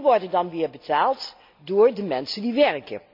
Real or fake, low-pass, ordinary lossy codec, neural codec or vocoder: real; 5.4 kHz; MP3, 48 kbps; none